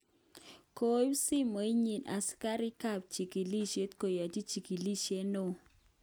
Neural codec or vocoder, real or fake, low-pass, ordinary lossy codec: none; real; none; none